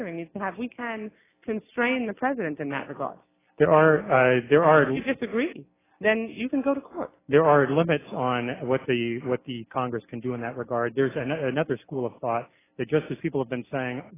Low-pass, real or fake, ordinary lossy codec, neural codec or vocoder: 3.6 kHz; real; AAC, 16 kbps; none